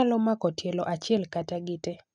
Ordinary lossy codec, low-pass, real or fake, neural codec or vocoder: none; 9.9 kHz; real; none